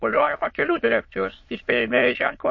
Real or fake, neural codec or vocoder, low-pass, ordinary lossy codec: fake; autoencoder, 22.05 kHz, a latent of 192 numbers a frame, VITS, trained on many speakers; 7.2 kHz; MP3, 32 kbps